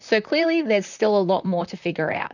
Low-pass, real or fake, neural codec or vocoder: 7.2 kHz; fake; vocoder, 44.1 kHz, 128 mel bands, Pupu-Vocoder